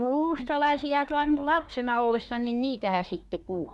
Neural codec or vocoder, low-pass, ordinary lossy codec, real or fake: codec, 24 kHz, 1 kbps, SNAC; none; none; fake